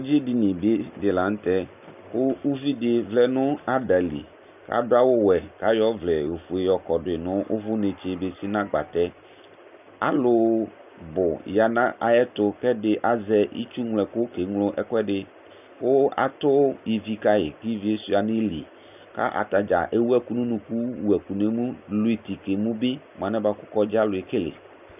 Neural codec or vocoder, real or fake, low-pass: none; real; 3.6 kHz